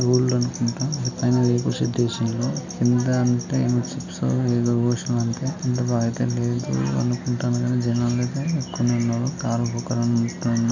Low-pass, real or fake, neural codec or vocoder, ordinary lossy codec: 7.2 kHz; real; none; none